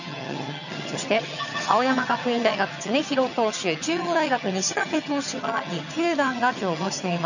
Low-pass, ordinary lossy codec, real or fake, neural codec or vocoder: 7.2 kHz; none; fake; vocoder, 22.05 kHz, 80 mel bands, HiFi-GAN